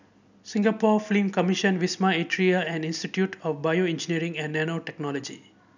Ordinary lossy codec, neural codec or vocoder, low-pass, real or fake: none; none; 7.2 kHz; real